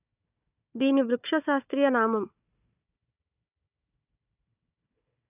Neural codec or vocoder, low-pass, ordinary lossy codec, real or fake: codec, 16 kHz, 4 kbps, FunCodec, trained on Chinese and English, 50 frames a second; 3.6 kHz; none; fake